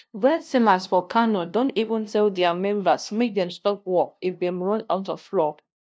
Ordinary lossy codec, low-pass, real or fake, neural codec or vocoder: none; none; fake; codec, 16 kHz, 0.5 kbps, FunCodec, trained on LibriTTS, 25 frames a second